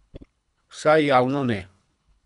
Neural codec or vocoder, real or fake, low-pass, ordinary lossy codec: codec, 24 kHz, 3 kbps, HILCodec; fake; 10.8 kHz; none